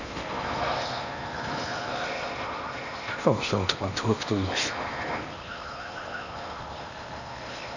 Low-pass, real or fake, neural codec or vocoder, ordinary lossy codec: 7.2 kHz; fake; codec, 16 kHz in and 24 kHz out, 0.8 kbps, FocalCodec, streaming, 65536 codes; none